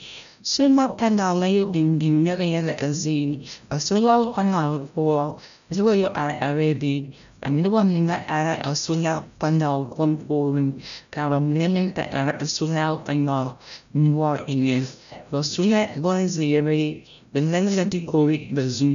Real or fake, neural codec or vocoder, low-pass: fake; codec, 16 kHz, 0.5 kbps, FreqCodec, larger model; 7.2 kHz